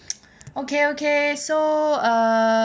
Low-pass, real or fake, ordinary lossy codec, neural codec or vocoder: none; real; none; none